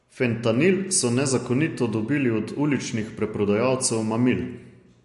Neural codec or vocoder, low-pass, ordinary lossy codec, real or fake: none; 14.4 kHz; MP3, 48 kbps; real